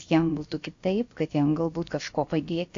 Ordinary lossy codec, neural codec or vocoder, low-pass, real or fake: AAC, 48 kbps; codec, 16 kHz, about 1 kbps, DyCAST, with the encoder's durations; 7.2 kHz; fake